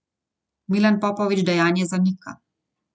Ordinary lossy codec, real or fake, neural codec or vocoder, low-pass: none; real; none; none